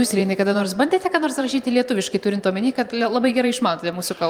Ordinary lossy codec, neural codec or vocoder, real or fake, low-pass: Opus, 32 kbps; vocoder, 44.1 kHz, 128 mel bands every 512 samples, BigVGAN v2; fake; 19.8 kHz